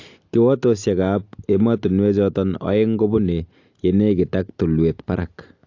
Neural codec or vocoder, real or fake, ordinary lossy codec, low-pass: none; real; AAC, 48 kbps; 7.2 kHz